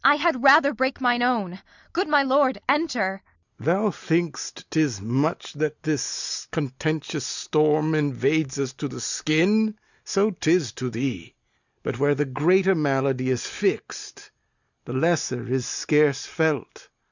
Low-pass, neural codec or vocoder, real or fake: 7.2 kHz; none; real